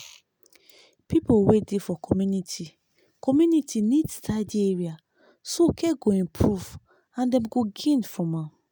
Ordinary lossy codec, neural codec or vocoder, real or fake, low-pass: none; none; real; none